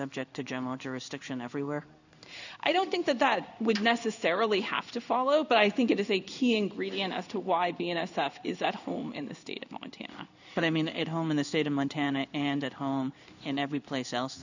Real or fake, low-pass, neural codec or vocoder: fake; 7.2 kHz; codec, 16 kHz in and 24 kHz out, 1 kbps, XY-Tokenizer